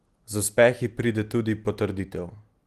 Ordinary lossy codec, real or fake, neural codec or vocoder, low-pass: Opus, 24 kbps; real; none; 14.4 kHz